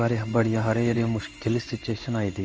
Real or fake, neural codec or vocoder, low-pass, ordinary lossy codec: fake; codec, 16 kHz in and 24 kHz out, 1 kbps, XY-Tokenizer; 7.2 kHz; Opus, 24 kbps